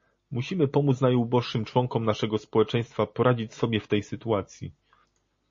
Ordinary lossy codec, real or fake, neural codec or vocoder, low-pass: MP3, 32 kbps; real; none; 7.2 kHz